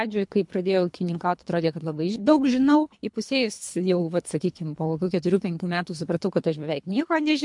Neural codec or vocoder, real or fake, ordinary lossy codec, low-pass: codec, 24 kHz, 3 kbps, HILCodec; fake; MP3, 64 kbps; 10.8 kHz